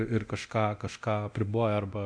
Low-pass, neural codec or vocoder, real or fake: 9.9 kHz; codec, 24 kHz, 0.9 kbps, DualCodec; fake